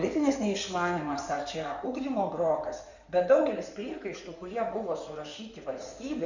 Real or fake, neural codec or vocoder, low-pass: fake; codec, 16 kHz in and 24 kHz out, 2.2 kbps, FireRedTTS-2 codec; 7.2 kHz